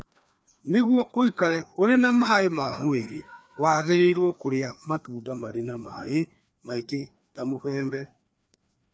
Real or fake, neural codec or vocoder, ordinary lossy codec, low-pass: fake; codec, 16 kHz, 2 kbps, FreqCodec, larger model; none; none